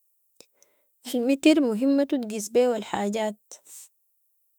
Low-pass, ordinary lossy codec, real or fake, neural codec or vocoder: none; none; fake; autoencoder, 48 kHz, 32 numbers a frame, DAC-VAE, trained on Japanese speech